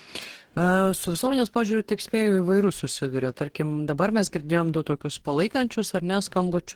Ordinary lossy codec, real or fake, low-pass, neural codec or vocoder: Opus, 16 kbps; fake; 19.8 kHz; codec, 44.1 kHz, 2.6 kbps, DAC